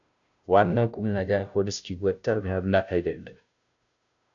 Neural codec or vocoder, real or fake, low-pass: codec, 16 kHz, 0.5 kbps, FunCodec, trained on Chinese and English, 25 frames a second; fake; 7.2 kHz